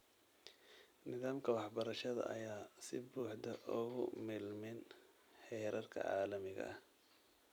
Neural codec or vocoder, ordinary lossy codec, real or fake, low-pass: vocoder, 44.1 kHz, 128 mel bands every 256 samples, BigVGAN v2; none; fake; none